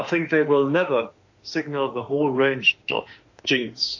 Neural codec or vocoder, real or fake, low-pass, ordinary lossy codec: autoencoder, 48 kHz, 32 numbers a frame, DAC-VAE, trained on Japanese speech; fake; 7.2 kHz; AAC, 48 kbps